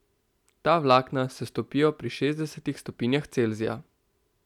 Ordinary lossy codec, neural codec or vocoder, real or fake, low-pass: none; none; real; 19.8 kHz